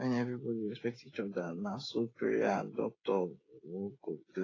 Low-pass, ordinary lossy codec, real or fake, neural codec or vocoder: 7.2 kHz; AAC, 32 kbps; fake; codec, 16 kHz, 8 kbps, FreqCodec, smaller model